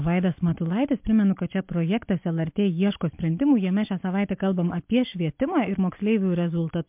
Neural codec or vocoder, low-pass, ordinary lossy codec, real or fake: none; 3.6 kHz; MP3, 32 kbps; real